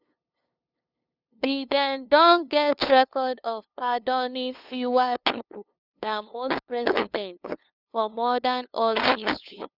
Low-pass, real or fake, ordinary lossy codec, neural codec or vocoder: 5.4 kHz; fake; none; codec, 16 kHz, 2 kbps, FunCodec, trained on LibriTTS, 25 frames a second